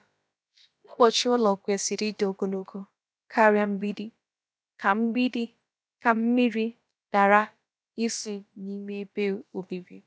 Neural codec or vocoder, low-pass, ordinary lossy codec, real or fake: codec, 16 kHz, about 1 kbps, DyCAST, with the encoder's durations; none; none; fake